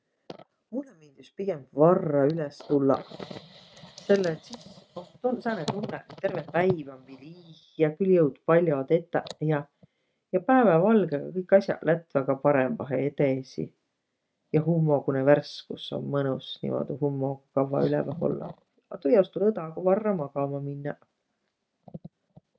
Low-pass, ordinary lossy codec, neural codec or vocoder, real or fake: none; none; none; real